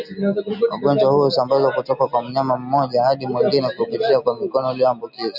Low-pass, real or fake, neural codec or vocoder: 5.4 kHz; real; none